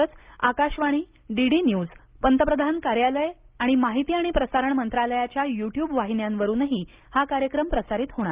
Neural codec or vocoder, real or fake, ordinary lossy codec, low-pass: none; real; Opus, 24 kbps; 3.6 kHz